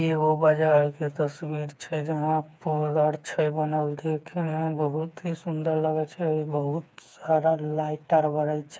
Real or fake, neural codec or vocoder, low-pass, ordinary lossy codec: fake; codec, 16 kHz, 4 kbps, FreqCodec, smaller model; none; none